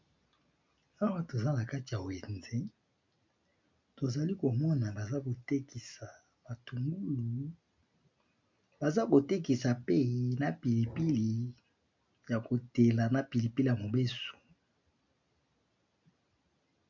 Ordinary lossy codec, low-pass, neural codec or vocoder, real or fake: MP3, 64 kbps; 7.2 kHz; none; real